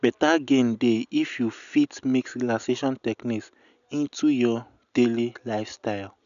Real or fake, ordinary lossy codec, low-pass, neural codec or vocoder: real; none; 7.2 kHz; none